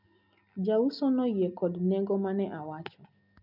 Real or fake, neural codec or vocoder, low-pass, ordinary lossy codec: real; none; 5.4 kHz; none